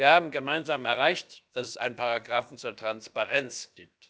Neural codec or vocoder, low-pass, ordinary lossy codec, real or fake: codec, 16 kHz, about 1 kbps, DyCAST, with the encoder's durations; none; none; fake